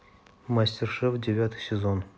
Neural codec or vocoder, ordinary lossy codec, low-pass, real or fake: none; none; none; real